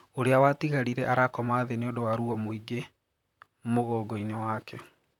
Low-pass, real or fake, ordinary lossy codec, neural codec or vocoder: 19.8 kHz; fake; none; vocoder, 44.1 kHz, 128 mel bands, Pupu-Vocoder